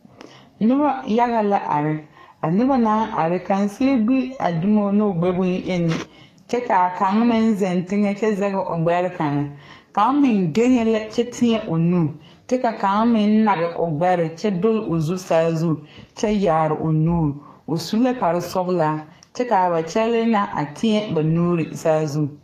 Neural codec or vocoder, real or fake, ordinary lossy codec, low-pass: codec, 44.1 kHz, 2.6 kbps, SNAC; fake; AAC, 48 kbps; 14.4 kHz